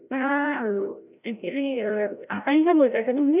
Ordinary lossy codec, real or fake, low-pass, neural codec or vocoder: none; fake; 3.6 kHz; codec, 16 kHz, 0.5 kbps, FreqCodec, larger model